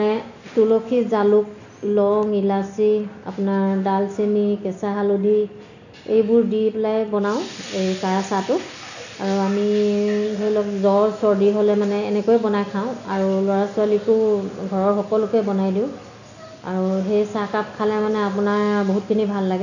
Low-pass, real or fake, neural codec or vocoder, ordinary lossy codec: 7.2 kHz; real; none; none